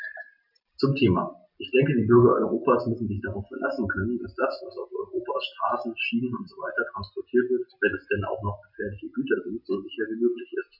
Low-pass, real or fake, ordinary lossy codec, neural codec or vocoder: 5.4 kHz; real; none; none